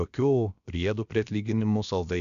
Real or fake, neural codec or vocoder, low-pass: fake; codec, 16 kHz, about 1 kbps, DyCAST, with the encoder's durations; 7.2 kHz